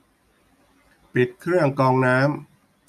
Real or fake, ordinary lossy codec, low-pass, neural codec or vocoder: real; none; 14.4 kHz; none